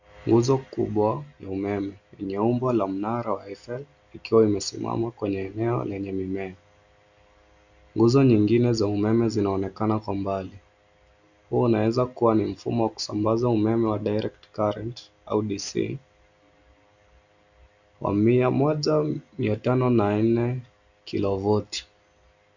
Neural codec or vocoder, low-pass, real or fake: none; 7.2 kHz; real